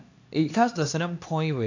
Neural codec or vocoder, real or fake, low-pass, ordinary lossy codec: codec, 16 kHz, 2 kbps, X-Codec, HuBERT features, trained on LibriSpeech; fake; 7.2 kHz; AAC, 48 kbps